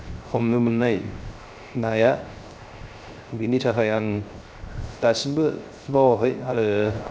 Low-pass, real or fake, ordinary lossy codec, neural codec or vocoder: none; fake; none; codec, 16 kHz, 0.3 kbps, FocalCodec